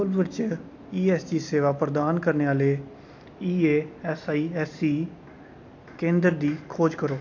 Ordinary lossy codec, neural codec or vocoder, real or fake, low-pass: AAC, 48 kbps; none; real; 7.2 kHz